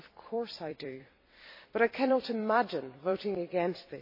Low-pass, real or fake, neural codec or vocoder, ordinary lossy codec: 5.4 kHz; fake; vocoder, 44.1 kHz, 128 mel bands every 256 samples, BigVGAN v2; MP3, 24 kbps